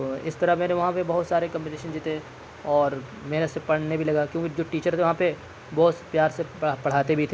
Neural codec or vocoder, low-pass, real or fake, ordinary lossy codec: none; none; real; none